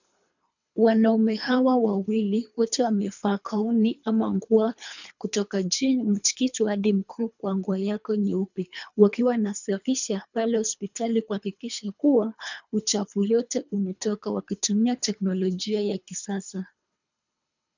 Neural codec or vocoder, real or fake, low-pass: codec, 24 kHz, 3 kbps, HILCodec; fake; 7.2 kHz